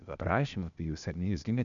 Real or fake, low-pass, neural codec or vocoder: fake; 7.2 kHz; codec, 16 kHz, 0.8 kbps, ZipCodec